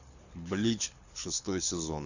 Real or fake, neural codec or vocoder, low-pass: fake; codec, 24 kHz, 6 kbps, HILCodec; 7.2 kHz